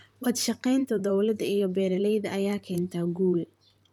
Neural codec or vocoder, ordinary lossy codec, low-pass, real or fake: vocoder, 44.1 kHz, 128 mel bands, Pupu-Vocoder; none; 19.8 kHz; fake